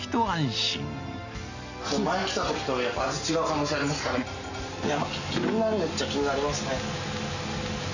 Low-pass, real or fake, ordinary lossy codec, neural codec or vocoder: 7.2 kHz; real; none; none